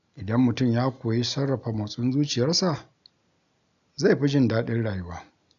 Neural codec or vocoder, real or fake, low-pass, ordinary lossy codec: none; real; 7.2 kHz; none